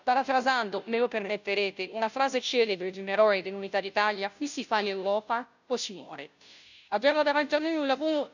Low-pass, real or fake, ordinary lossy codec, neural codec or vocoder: 7.2 kHz; fake; none; codec, 16 kHz, 0.5 kbps, FunCodec, trained on Chinese and English, 25 frames a second